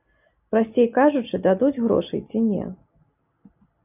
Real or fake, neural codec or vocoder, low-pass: real; none; 3.6 kHz